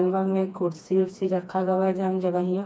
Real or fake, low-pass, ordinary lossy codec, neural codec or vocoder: fake; none; none; codec, 16 kHz, 2 kbps, FreqCodec, smaller model